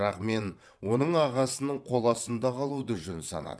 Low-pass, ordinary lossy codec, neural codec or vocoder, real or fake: none; none; vocoder, 22.05 kHz, 80 mel bands, WaveNeXt; fake